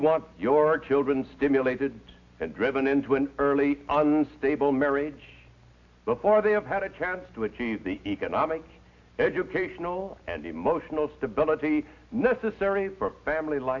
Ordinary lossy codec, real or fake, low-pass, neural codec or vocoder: AAC, 48 kbps; real; 7.2 kHz; none